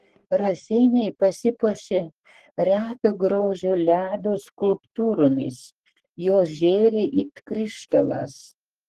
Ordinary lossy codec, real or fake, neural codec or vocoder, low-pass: Opus, 16 kbps; fake; codec, 44.1 kHz, 3.4 kbps, Pupu-Codec; 14.4 kHz